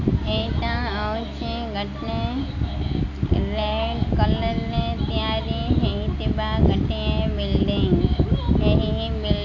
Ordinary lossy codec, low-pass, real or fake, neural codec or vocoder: AAC, 48 kbps; 7.2 kHz; real; none